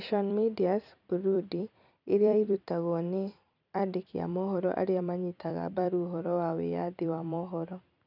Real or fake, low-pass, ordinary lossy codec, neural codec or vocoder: fake; 5.4 kHz; none; vocoder, 44.1 kHz, 128 mel bands every 256 samples, BigVGAN v2